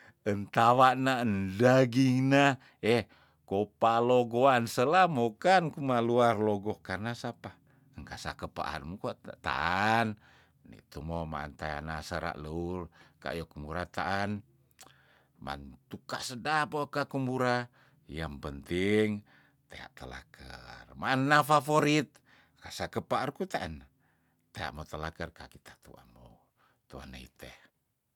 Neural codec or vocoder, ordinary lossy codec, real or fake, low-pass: none; none; real; 19.8 kHz